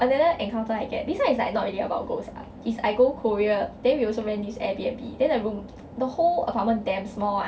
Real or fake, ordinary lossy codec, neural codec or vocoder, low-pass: real; none; none; none